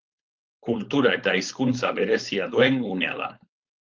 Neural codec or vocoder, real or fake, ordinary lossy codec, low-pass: codec, 16 kHz, 4.8 kbps, FACodec; fake; Opus, 32 kbps; 7.2 kHz